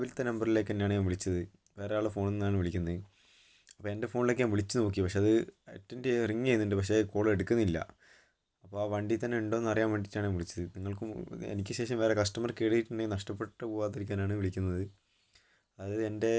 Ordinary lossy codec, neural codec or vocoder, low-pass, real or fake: none; none; none; real